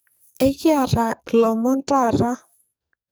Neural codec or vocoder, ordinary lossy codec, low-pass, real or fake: codec, 44.1 kHz, 2.6 kbps, SNAC; none; none; fake